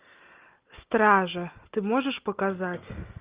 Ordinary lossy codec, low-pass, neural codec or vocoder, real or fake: Opus, 32 kbps; 3.6 kHz; none; real